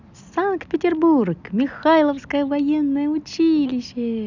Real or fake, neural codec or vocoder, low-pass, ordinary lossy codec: real; none; 7.2 kHz; none